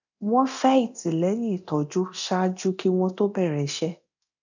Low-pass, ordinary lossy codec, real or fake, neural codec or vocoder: 7.2 kHz; none; fake; codec, 24 kHz, 0.9 kbps, DualCodec